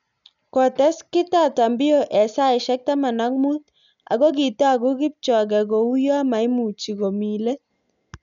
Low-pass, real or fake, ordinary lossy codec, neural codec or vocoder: 7.2 kHz; real; none; none